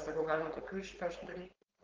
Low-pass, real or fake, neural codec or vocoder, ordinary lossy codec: 7.2 kHz; fake; codec, 16 kHz, 4.8 kbps, FACodec; Opus, 24 kbps